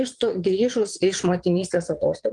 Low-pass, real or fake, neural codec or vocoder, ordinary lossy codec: 9.9 kHz; fake; vocoder, 22.05 kHz, 80 mel bands, Vocos; Opus, 24 kbps